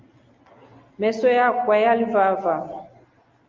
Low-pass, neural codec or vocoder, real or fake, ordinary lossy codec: 7.2 kHz; none; real; Opus, 32 kbps